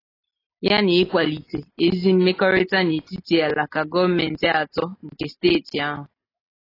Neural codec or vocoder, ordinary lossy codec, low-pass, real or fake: none; AAC, 24 kbps; 5.4 kHz; real